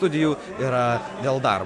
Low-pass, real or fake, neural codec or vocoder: 10.8 kHz; real; none